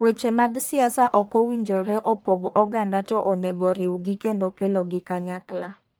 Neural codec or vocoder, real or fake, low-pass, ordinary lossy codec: codec, 44.1 kHz, 1.7 kbps, Pupu-Codec; fake; none; none